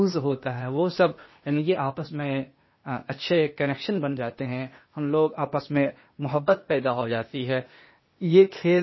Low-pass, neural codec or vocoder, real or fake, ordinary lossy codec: 7.2 kHz; codec, 16 kHz, 0.8 kbps, ZipCodec; fake; MP3, 24 kbps